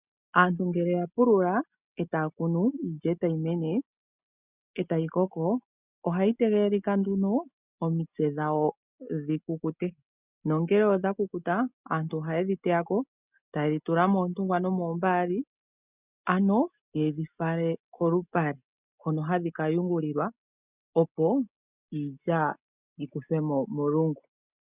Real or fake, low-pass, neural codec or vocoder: real; 3.6 kHz; none